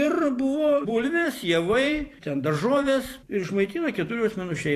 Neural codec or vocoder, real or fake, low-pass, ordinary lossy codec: vocoder, 44.1 kHz, 128 mel bands every 512 samples, BigVGAN v2; fake; 14.4 kHz; AAC, 64 kbps